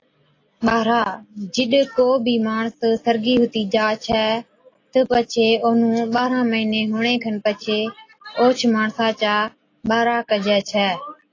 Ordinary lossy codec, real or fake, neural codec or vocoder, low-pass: AAC, 32 kbps; real; none; 7.2 kHz